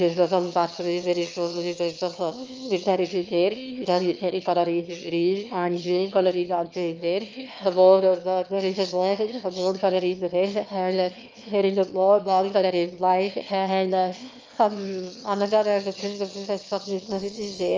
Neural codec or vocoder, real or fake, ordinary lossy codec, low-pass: autoencoder, 22.05 kHz, a latent of 192 numbers a frame, VITS, trained on one speaker; fake; Opus, 24 kbps; 7.2 kHz